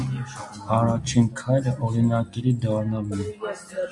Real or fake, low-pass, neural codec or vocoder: real; 10.8 kHz; none